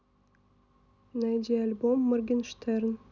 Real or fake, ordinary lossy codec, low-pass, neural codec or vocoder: real; none; 7.2 kHz; none